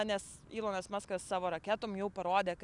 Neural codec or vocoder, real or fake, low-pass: none; real; 10.8 kHz